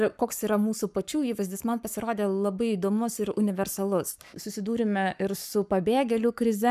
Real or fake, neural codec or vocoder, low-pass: fake; codec, 44.1 kHz, 7.8 kbps, Pupu-Codec; 14.4 kHz